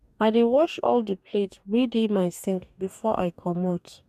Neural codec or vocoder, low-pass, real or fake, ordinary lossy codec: codec, 44.1 kHz, 2.6 kbps, DAC; 14.4 kHz; fake; none